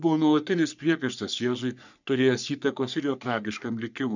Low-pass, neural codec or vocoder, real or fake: 7.2 kHz; codec, 44.1 kHz, 3.4 kbps, Pupu-Codec; fake